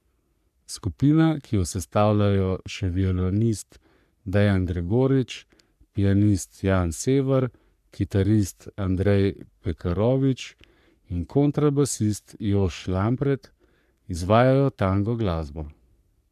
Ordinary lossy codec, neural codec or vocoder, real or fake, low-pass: none; codec, 44.1 kHz, 3.4 kbps, Pupu-Codec; fake; 14.4 kHz